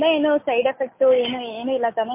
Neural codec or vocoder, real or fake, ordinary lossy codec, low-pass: none; real; MP3, 24 kbps; 3.6 kHz